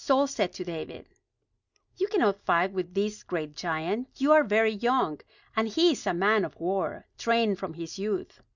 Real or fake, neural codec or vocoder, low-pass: real; none; 7.2 kHz